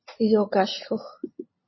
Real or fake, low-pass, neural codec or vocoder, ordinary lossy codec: real; 7.2 kHz; none; MP3, 24 kbps